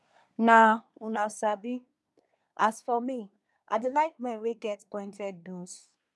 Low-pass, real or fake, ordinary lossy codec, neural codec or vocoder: none; fake; none; codec, 24 kHz, 1 kbps, SNAC